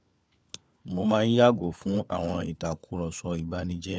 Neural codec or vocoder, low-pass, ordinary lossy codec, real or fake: codec, 16 kHz, 4 kbps, FunCodec, trained on LibriTTS, 50 frames a second; none; none; fake